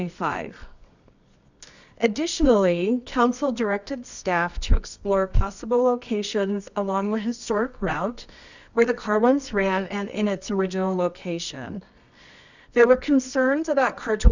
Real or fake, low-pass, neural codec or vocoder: fake; 7.2 kHz; codec, 24 kHz, 0.9 kbps, WavTokenizer, medium music audio release